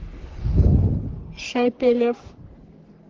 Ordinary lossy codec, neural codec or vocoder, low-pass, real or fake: Opus, 16 kbps; codec, 44.1 kHz, 3.4 kbps, Pupu-Codec; 7.2 kHz; fake